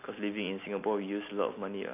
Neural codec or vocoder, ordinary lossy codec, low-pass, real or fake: none; none; 3.6 kHz; real